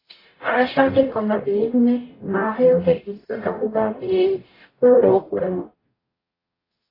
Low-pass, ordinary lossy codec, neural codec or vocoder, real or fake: 5.4 kHz; AAC, 24 kbps; codec, 44.1 kHz, 0.9 kbps, DAC; fake